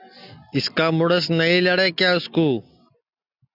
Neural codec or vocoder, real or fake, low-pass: none; real; 5.4 kHz